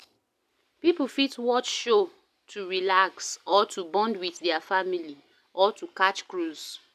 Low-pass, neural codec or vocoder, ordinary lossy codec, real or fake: 14.4 kHz; none; none; real